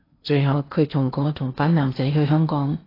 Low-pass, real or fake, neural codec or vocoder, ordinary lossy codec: 5.4 kHz; fake; codec, 16 kHz in and 24 kHz out, 0.8 kbps, FocalCodec, streaming, 65536 codes; AAC, 24 kbps